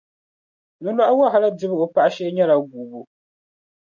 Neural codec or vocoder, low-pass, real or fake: none; 7.2 kHz; real